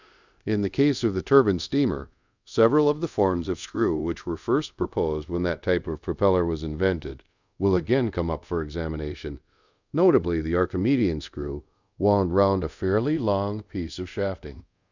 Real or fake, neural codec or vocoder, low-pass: fake; codec, 24 kHz, 0.5 kbps, DualCodec; 7.2 kHz